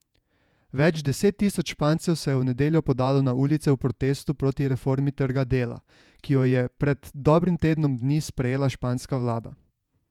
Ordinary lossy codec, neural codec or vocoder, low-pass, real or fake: none; vocoder, 48 kHz, 128 mel bands, Vocos; 19.8 kHz; fake